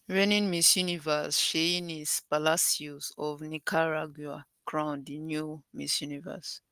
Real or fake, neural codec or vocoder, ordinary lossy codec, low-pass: real; none; Opus, 24 kbps; 14.4 kHz